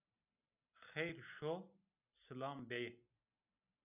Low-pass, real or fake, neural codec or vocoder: 3.6 kHz; real; none